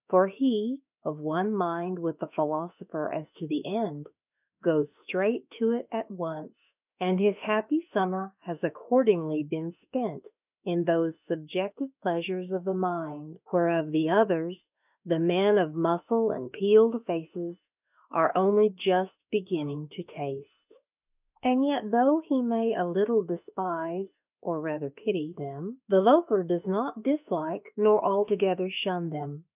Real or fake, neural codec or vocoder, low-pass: fake; autoencoder, 48 kHz, 32 numbers a frame, DAC-VAE, trained on Japanese speech; 3.6 kHz